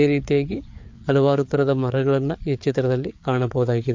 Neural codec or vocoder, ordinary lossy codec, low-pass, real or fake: codec, 16 kHz, 4 kbps, FreqCodec, larger model; MP3, 48 kbps; 7.2 kHz; fake